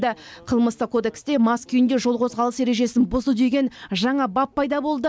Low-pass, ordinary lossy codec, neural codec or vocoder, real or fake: none; none; none; real